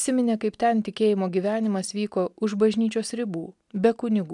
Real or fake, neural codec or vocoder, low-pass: real; none; 10.8 kHz